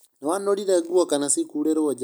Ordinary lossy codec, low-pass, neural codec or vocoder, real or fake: none; none; none; real